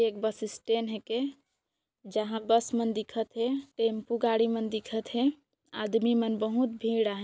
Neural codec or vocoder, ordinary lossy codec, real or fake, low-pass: none; none; real; none